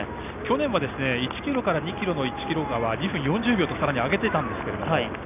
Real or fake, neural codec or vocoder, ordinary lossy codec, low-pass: real; none; none; 3.6 kHz